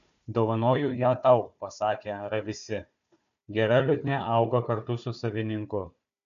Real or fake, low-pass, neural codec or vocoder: fake; 7.2 kHz; codec, 16 kHz, 4 kbps, FunCodec, trained on Chinese and English, 50 frames a second